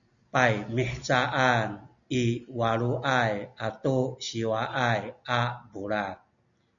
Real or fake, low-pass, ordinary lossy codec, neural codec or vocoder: real; 7.2 kHz; MP3, 96 kbps; none